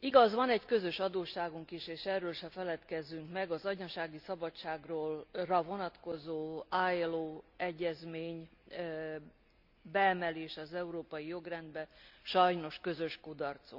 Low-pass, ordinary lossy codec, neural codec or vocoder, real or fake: 5.4 kHz; none; none; real